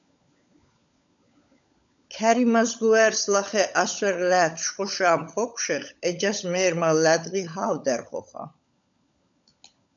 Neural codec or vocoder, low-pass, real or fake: codec, 16 kHz, 16 kbps, FunCodec, trained on LibriTTS, 50 frames a second; 7.2 kHz; fake